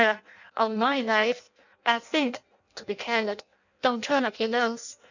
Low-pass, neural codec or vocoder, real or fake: 7.2 kHz; codec, 16 kHz in and 24 kHz out, 0.6 kbps, FireRedTTS-2 codec; fake